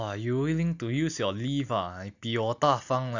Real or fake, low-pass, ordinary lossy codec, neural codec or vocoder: real; 7.2 kHz; none; none